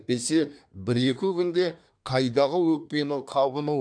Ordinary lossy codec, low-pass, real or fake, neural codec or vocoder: none; 9.9 kHz; fake; codec, 24 kHz, 1 kbps, SNAC